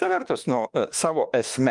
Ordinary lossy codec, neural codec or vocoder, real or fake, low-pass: Opus, 32 kbps; autoencoder, 48 kHz, 32 numbers a frame, DAC-VAE, trained on Japanese speech; fake; 10.8 kHz